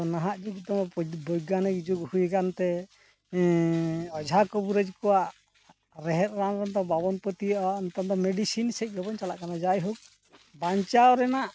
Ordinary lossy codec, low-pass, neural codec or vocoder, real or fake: none; none; none; real